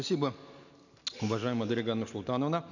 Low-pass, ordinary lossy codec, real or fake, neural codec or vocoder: 7.2 kHz; none; real; none